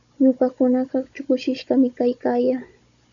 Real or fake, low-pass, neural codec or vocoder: fake; 7.2 kHz; codec, 16 kHz, 16 kbps, FunCodec, trained on Chinese and English, 50 frames a second